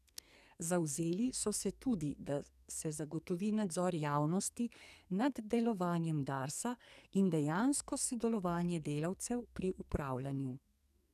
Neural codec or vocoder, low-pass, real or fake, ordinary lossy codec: codec, 44.1 kHz, 2.6 kbps, SNAC; 14.4 kHz; fake; none